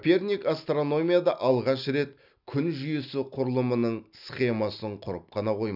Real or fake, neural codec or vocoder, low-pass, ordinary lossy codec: real; none; 5.4 kHz; none